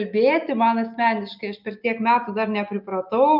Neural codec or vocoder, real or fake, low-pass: none; real; 5.4 kHz